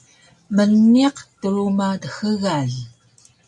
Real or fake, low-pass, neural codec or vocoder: real; 10.8 kHz; none